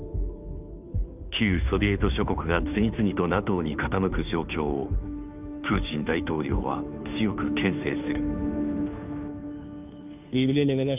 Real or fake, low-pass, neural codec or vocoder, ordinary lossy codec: fake; 3.6 kHz; codec, 16 kHz, 2 kbps, FunCodec, trained on Chinese and English, 25 frames a second; none